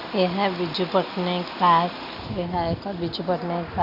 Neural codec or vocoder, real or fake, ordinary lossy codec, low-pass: none; real; none; 5.4 kHz